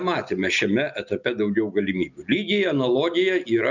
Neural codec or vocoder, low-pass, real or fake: none; 7.2 kHz; real